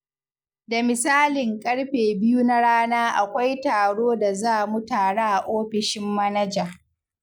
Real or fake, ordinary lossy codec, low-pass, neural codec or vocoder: real; none; none; none